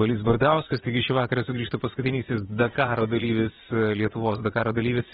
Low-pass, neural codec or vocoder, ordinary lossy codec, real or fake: 9.9 kHz; vocoder, 22.05 kHz, 80 mel bands, Vocos; AAC, 16 kbps; fake